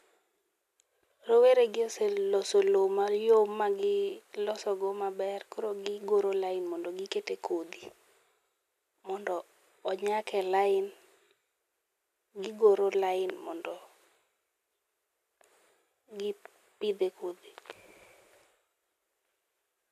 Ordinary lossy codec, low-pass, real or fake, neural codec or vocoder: none; 14.4 kHz; real; none